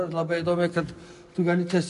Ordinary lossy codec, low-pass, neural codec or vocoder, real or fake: MP3, 96 kbps; 10.8 kHz; none; real